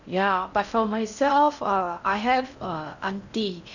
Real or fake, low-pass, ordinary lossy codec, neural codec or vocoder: fake; 7.2 kHz; none; codec, 16 kHz in and 24 kHz out, 0.6 kbps, FocalCodec, streaming, 4096 codes